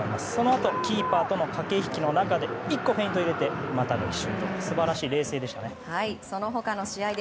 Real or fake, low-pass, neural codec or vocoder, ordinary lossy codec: real; none; none; none